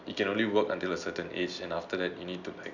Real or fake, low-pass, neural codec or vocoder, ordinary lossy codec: real; 7.2 kHz; none; none